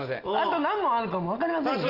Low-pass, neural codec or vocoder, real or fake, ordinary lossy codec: 5.4 kHz; none; real; Opus, 24 kbps